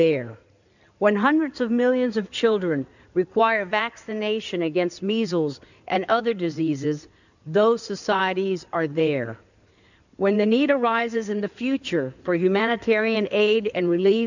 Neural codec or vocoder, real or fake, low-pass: codec, 16 kHz in and 24 kHz out, 2.2 kbps, FireRedTTS-2 codec; fake; 7.2 kHz